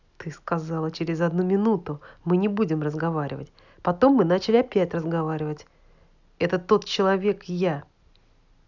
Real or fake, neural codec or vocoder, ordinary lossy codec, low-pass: real; none; none; 7.2 kHz